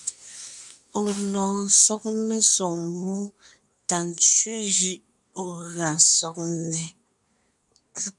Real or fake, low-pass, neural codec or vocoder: fake; 10.8 kHz; codec, 24 kHz, 1 kbps, SNAC